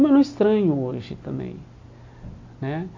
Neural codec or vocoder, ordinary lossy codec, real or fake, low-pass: none; MP3, 48 kbps; real; 7.2 kHz